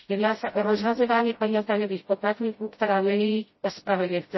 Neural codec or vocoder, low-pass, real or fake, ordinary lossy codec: codec, 16 kHz, 0.5 kbps, FreqCodec, smaller model; 7.2 kHz; fake; MP3, 24 kbps